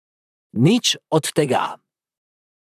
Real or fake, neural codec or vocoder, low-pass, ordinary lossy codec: fake; vocoder, 44.1 kHz, 128 mel bands, Pupu-Vocoder; 14.4 kHz; none